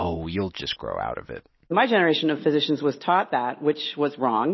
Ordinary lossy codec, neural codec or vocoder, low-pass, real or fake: MP3, 24 kbps; none; 7.2 kHz; real